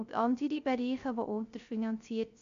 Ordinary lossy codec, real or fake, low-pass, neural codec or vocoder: MP3, 64 kbps; fake; 7.2 kHz; codec, 16 kHz, 0.3 kbps, FocalCodec